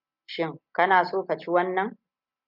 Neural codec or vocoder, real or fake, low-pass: none; real; 5.4 kHz